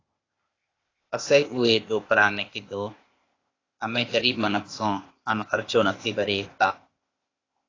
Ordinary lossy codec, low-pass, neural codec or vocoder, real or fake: AAC, 32 kbps; 7.2 kHz; codec, 16 kHz, 0.8 kbps, ZipCodec; fake